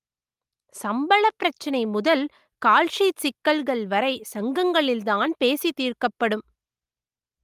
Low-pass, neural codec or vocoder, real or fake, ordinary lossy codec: 14.4 kHz; none; real; Opus, 32 kbps